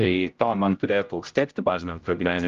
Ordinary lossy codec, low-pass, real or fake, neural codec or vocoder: Opus, 32 kbps; 7.2 kHz; fake; codec, 16 kHz, 0.5 kbps, X-Codec, HuBERT features, trained on general audio